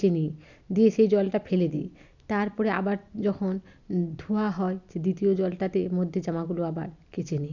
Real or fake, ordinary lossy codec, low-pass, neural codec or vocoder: real; none; 7.2 kHz; none